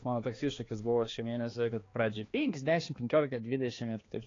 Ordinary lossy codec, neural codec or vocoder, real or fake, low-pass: AAC, 32 kbps; codec, 16 kHz, 2 kbps, X-Codec, HuBERT features, trained on balanced general audio; fake; 7.2 kHz